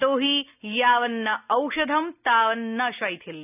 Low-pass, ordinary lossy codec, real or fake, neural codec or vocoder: 3.6 kHz; none; real; none